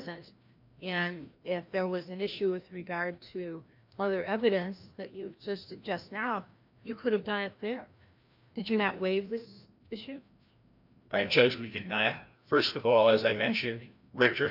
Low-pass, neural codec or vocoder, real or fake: 5.4 kHz; codec, 16 kHz, 1 kbps, FreqCodec, larger model; fake